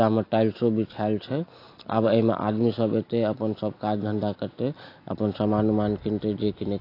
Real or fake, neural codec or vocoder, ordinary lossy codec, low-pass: real; none; AAC, 32 kbps; 5.4 kHz